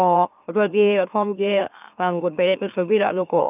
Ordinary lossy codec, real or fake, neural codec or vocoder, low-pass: none; fake; autoencoder, 44.1 kHz, a latent of 192 numbers a frame, MeloTTS; 3.6 kHz